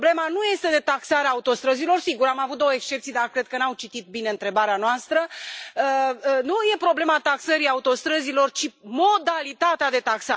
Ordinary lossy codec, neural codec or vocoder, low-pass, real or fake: none; none; none; real